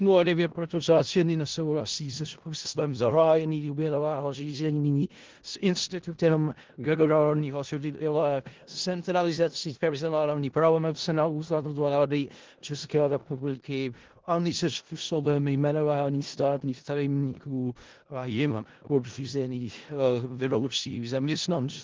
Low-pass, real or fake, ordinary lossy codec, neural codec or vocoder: 7.2 kHz; fake; Opus, 16 kbps; codec, 16 kHz in and 24 kHz out, 0.4 kbps, LongCat-Audio-Codec, four codebook decoder